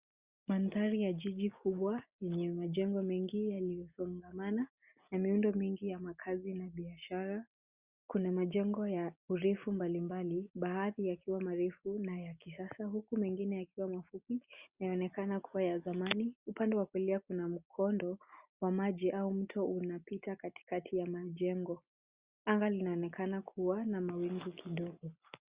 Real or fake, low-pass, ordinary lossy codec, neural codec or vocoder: real; 3.6 kHz; Opus, 64 kbps; none